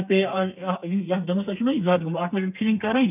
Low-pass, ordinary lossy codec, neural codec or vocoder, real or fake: 3.6 kHz; none; codec, 32 kHz, 1.9 kbps, SNAC; fake